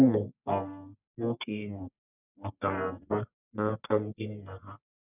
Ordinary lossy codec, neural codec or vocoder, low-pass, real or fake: none; codec, 44.1 kHz, 1.7 kbps, Pupu-Codec; 3.6 kHz; fake